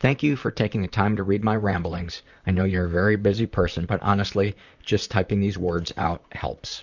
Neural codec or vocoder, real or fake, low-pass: vocoder, 44.1 kHz, 128 mel bands, Pupu-Vocoder; fake; 7.2 kHz